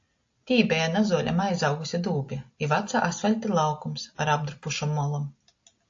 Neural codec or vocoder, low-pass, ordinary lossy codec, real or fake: none; 7.2 kHz; AAC, 48 kbps; real